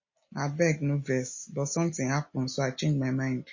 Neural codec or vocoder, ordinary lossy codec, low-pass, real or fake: none; MP3, 32 kbps; 7.2 kHz; real